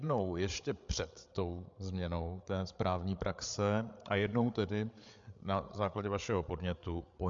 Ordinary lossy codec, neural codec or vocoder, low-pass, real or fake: MP3, 64 kbps; codec, 16 kHz, 8 kbps, FreqCodec, larger model; 7.2 kHz; fake